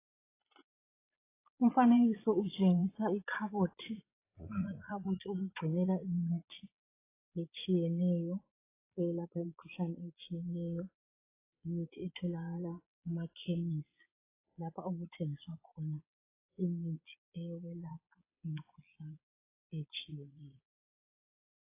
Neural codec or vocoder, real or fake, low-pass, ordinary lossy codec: vocoder, 22.05 kHz, 80 mel bands, Vocos; fake; 3.6 kHz; AAC, 24 kbps